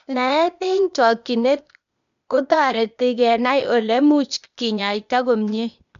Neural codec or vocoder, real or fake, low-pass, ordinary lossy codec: codec, 16 kHz, 0.8 kbps, ZipCodec; fake; 7.2 kHz; none